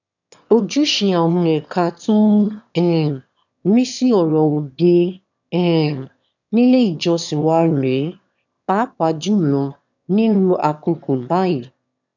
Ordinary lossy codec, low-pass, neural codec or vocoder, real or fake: none; 7.2 kHz; autoencoder, 22.05 kHz, a latent of 192 numbers a frame, VITS, trained on one speaker; fake